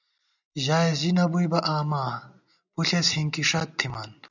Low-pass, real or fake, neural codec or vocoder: 7.2 kHz; real; none